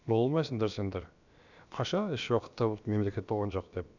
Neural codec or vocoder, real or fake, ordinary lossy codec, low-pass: codec, 16 kHz, about 1 kbps, DyCAST, with the encoder's durations; fake; none; 7.2 kHz